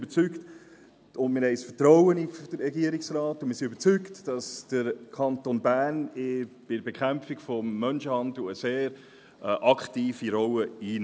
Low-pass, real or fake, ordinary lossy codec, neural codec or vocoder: none; real; none; none